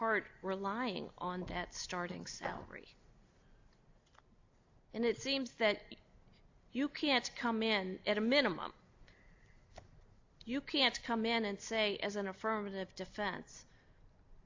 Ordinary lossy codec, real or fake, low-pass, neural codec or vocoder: AAC, 48 kbps; real; 7.2 kHz; none